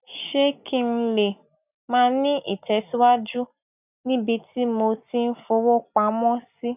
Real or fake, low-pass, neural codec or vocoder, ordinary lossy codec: real; 3.6 kHz; none; none